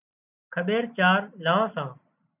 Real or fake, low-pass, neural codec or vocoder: real; 3.6 kHz; none